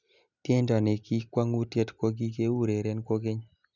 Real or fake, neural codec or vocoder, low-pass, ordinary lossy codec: real; none; 7.2 kHz; none